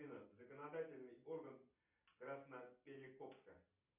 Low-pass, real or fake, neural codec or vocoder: 3.6 kHz; real; none